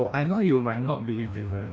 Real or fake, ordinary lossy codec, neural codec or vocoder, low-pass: fake; none; codec, 16 kHz, 1 kbps, FreqCodec, larger model; none